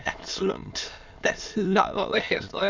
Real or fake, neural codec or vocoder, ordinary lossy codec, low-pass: fake; autoencoder, 22.05 kHz, a latent of 192 numbers a frame, VITS, trained on many speakers; MP3, 64 kbps; 7.2 kHz